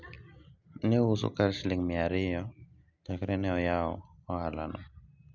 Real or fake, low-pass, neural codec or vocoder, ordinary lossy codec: real; 7.2 kHz; none; none